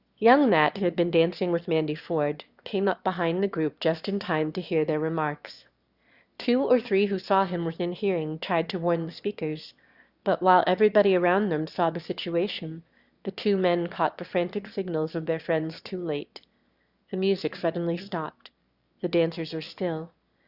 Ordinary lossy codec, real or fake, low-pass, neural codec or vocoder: Opus, 64 kbps; fake; 5.4 kHz; autoencoder, 22.05 kHz, a latent of 192 numbers a frame, VITS, trained on one speaker